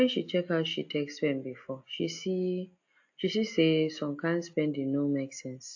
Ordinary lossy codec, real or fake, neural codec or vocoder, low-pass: AAC, 48 kbps; real; none; 7.2 kHz